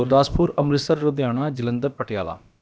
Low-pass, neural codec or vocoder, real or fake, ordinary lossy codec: none; codec, 16 kHz, about 1 kbps, DyCAST, with the encoder's durations; fake; none